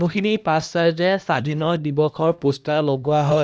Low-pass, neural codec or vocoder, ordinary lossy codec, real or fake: none; codec, 16 kHz, 1 kbps, X-Codec, HuBERT features, trained on LibriSpeech; none; fake